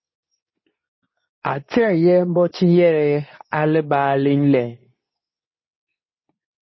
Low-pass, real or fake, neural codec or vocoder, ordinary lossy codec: 7.2 kHz; fake; codec, 24 kHz, 0.9 kbps, WavTokenizer, medium speech release version 2; MP3, 24 kbps